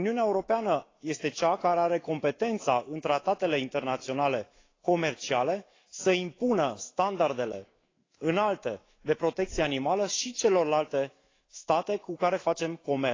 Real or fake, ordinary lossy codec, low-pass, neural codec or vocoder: fake; AAC, 32 kbps; 7.2 kHz; autoencoder, 48 kHz, 128 numbers a frame, DAC-VAE, trained on Japanese speech